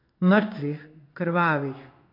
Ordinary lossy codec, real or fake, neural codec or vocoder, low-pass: MP3, 48 kbps; fake; codec, 16 kHz in and 24 kHz out, 1 kbps, XY-Tokenizer; 5.4 kHz